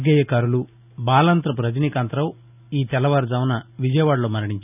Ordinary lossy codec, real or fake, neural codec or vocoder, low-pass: none; real; none; 3.6 kHz